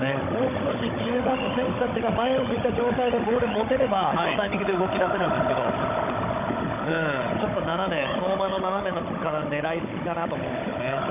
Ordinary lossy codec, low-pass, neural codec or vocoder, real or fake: none; 3.6 kHz; codec, 16 kHz, 16 kbps, FunCodec, trained on Chinese and English, 50 frames a second; fake